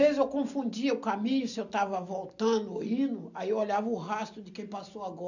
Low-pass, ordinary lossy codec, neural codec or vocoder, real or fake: 7.2 kHz; none; none; real